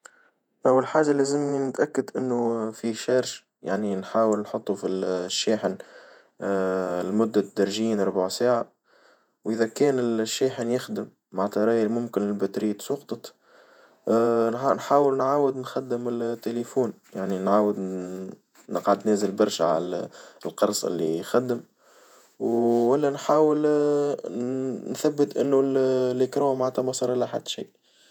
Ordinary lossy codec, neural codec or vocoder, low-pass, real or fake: none; vocoder, 48 kHz, 128 mel bands, Vocos; 19.8 kHz; fake